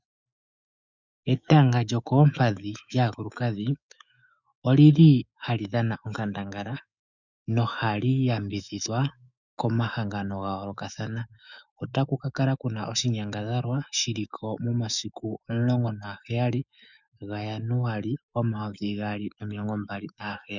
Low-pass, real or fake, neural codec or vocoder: 7.2 kHz; fake; autoencoder, 48 kHz, 128 numbers a frame, DAC-VAE, trained on Japanese speech